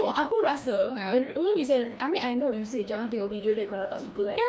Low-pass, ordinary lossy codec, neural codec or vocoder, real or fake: none; none; codec, 16 kHz, 1 kbps, FreqCodec, larger model; fake